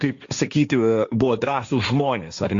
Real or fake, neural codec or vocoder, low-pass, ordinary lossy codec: fake; codec, 16 kHz, 1.1 kbps, Voila-Tokenizer; 7.2 kHz; Opus, 64 kbps